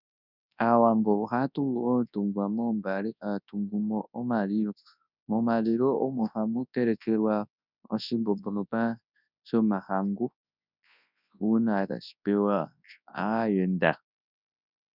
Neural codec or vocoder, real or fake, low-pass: codec, 24 kHz, 0.9 kbps, WavTokenizer, large speech release; fake; 5.4 kHz